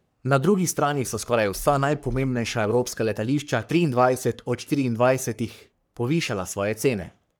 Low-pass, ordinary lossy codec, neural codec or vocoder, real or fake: none; none; codec, 44.1 kHz, 3.4 kbps, Pupu-Codec; fake